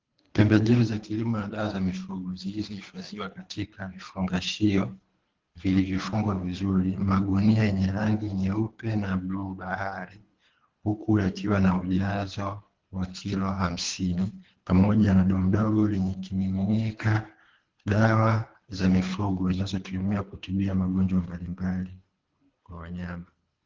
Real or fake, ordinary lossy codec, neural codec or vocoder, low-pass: fake; Opus, 16 kbps; codec, 24 kHz, 3 kbps, HILCodec; 7.2 kHz